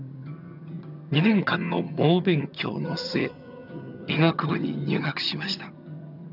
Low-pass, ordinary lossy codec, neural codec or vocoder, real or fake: 5.4 kHz; none; vocoder, 22.05 kHz, 80 mel bands, HiFi-GAN; fake